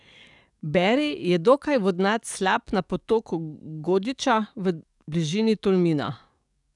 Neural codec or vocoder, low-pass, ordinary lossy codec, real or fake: codec, 44.1 kHz, 7.8 kbps, DAC; 10.8 kHz; none; fake